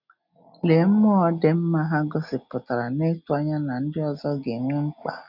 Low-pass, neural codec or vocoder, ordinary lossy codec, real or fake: 5.4 kHz; none; none; real